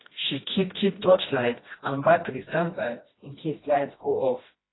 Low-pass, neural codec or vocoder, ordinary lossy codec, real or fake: 7.2 kHz; codec, 16 kHz, 1 kbps, FreqCodec, smaller model; AAC, 16 kbps; fake